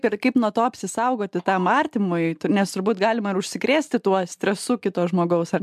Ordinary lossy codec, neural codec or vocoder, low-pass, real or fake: MP3, 96 kbps; none; 14.4 kHz; real